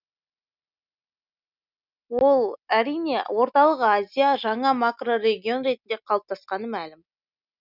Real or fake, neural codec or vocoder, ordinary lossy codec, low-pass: real; none; none; 5.4 kHz